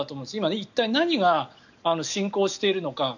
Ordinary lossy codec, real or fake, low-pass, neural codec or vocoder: none; real; 7.2 kHz; none